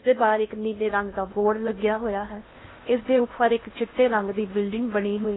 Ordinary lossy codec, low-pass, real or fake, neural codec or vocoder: AAC, 16 kbps; 7.2 kHz; fake; codec, 16 kHz in and 24 kHz out, 0.6 kbps, FocalCodec, streaming, 2048 codes